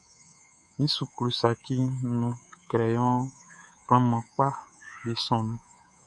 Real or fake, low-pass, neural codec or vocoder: fake; 10.8 kHz; codec, 24 kHz, 3.1 kbps, DualCodec